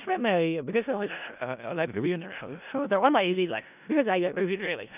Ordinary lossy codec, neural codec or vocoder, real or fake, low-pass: none; codec, 16 kHz in and 24 kHz out, 0.4 kbps, LongCat-Audio-Codec, four codebook decoder; fake; 3.6 kHz